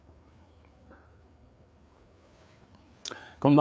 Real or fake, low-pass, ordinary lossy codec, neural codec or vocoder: fake; none; none; codec, 16 kHz, 4 kbps, FreqCodec, larger model